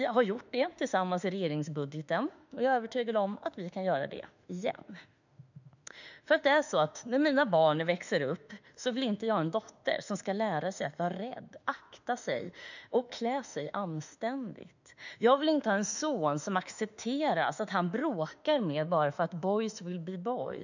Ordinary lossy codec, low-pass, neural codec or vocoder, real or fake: none; 7.2 kHz; autoencoder, 48 kHz, 32 numbers a frame, DAC-VAE, trained on Japanese speech; fake